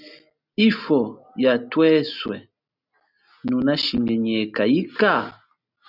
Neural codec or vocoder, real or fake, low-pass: none; real; 5.4 kHz